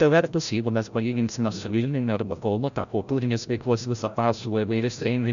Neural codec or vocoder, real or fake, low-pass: codec, 16 kHz, 0.5 kbps, FreqCodec, larger model; fake; 7.2 kHz